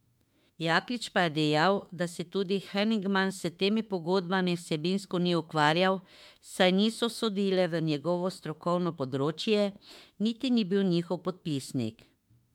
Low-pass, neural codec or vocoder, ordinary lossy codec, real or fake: 19.8 kHz; autoencoder, 48 kHz, 32 numbers a frame, DAC-VAE, trained on Japanese speech; MP3, 96 kbps; fake